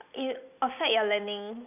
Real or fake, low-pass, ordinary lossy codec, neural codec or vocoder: real; 3.6 kHz; none; none